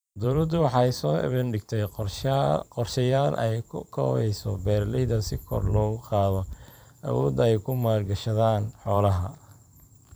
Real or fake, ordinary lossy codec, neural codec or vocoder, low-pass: fake; none; vocoder, 44.1 kHz, 128 mel bands every 512 samples, BigVGAN v2; none